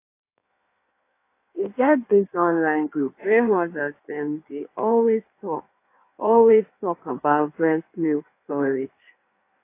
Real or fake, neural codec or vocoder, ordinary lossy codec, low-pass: fake; codec, 16 kHz in and 24 kHz out, 1.1 kbps, FireRedTTS-2 codec; AAC, 24 kbps; 3.6 kHz